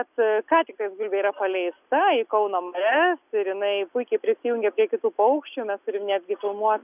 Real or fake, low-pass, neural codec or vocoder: real; 3.6 kHz; none